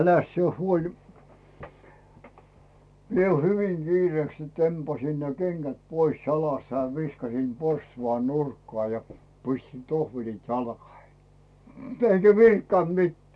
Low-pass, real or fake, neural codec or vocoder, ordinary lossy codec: none; real; none; none